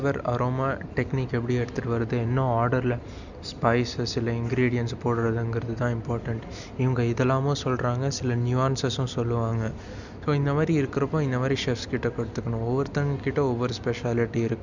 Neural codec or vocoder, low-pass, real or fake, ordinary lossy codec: none; 7.2 kHz; real; none